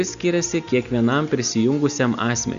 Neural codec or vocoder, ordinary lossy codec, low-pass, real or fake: none; Opus, 64 kbps; 7.2 kHz; real